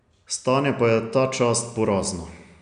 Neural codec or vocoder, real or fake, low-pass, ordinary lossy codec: none; real; 9.9 kHz; none